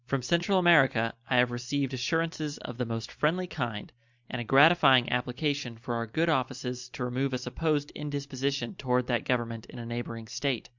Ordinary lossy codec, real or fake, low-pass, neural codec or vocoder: Opus, 64 kbps; real; 7.2 kHz; none